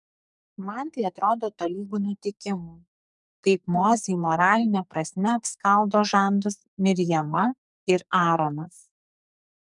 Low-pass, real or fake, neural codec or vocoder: 10.8 kHz; fake; codec, 44.1 kHz, 2.6 kbps, SNAC